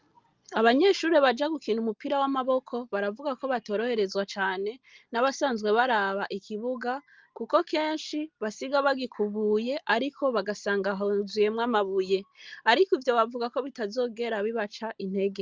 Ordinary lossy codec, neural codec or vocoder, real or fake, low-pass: Opus, 24 kbps; none; real; 7.2 kHz